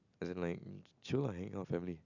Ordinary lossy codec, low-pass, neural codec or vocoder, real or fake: none; 7.2 kHz; none; real